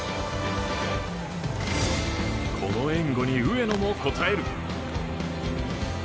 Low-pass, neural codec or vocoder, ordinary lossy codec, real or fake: none; none; none; real